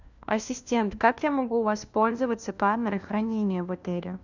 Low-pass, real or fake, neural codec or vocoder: 7.2 kHz; fake; codec, 16 kHz, 1 kbps, FunCodec, trained on LibriTTS, 50 frames a second